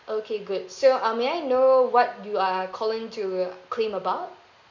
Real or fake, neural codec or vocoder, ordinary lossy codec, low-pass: real; none; none; 7.2 kHz